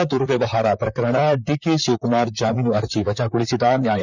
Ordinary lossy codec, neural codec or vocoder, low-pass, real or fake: none; vocoder, 44.1 kHz, 128 mel bands, Pupu-Vocoder; 7.2 kHz; fake